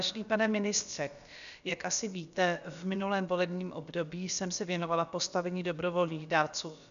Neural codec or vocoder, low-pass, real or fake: codec, 16 kHz, about 1 kbps, DyCAST, with the encoder's durations; 7.2 kHz; fake